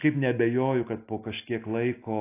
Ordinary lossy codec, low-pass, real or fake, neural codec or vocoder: AAC, 24 kbps; 3.6 kHz; real; none